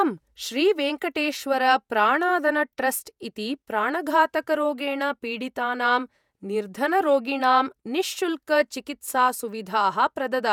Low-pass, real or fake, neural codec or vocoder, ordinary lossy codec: 19.8 kHz; fake; vocoder, 48 kHz, 128 mel bands, Vocos; none